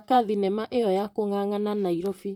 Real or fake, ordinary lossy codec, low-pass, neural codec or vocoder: fake; none; 19.8 kHz; vocoder, 44.1 kHz, 128 mel bands, Pupu-Vocoder